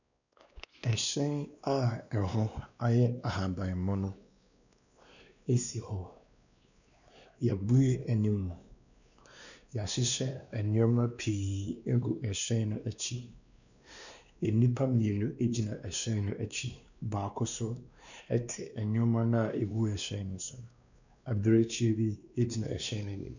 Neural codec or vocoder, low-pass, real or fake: codec, 16 kHz, 2 kbps, X-Codec, WavLM features, trained on Multilingual LibriSpeech; 7.2 kHz; fake